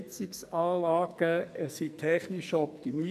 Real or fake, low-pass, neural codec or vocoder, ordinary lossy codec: fake; 14.4 kHz; codec, 44.1 kHz, 2.6 kbps, SNAC; none